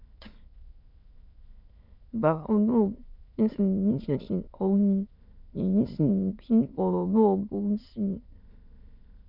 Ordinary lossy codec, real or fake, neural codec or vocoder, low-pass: none; fake; autoencoder, 22.05 kHz, a latent of 192 numbers a frame, VITS, trained on many speakers; 5.4 kHz